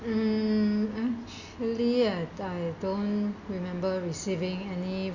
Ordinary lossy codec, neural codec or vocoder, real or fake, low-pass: none; none; real; 7.2 kHz